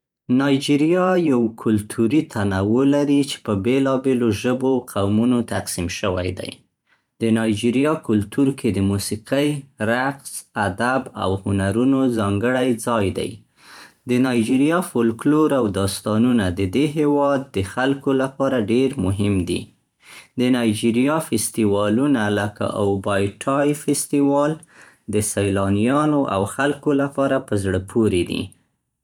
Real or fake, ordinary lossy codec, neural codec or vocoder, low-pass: fake; none; vocoder, 44.1 kHz, 128 mel bands every 512 samples, BigVGAN v2; 19.8 kHz